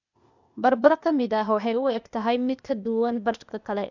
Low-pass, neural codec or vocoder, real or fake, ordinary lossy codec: 7.2 kHz; codec, 16 kHz, 0.8 kbps, ZipCodec; fake; none